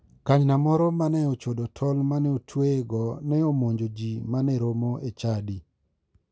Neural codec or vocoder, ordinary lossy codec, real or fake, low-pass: none; none; real; none